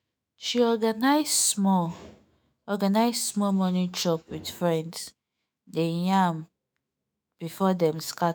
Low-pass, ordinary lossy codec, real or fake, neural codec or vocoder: none; none; fake; autoencoder, 48 kHz, 128 numbers a frame, DAC-VAE, trained on Japanese speech